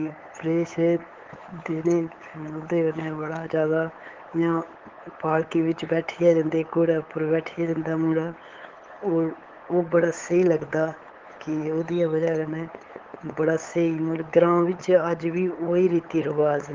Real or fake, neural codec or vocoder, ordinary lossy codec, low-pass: fake; codec, 16 kHz, 8 kbps, FunCodec, trained on LibriTTS, 25 frames a second; Opus, 16 kbps; 7.2 kHz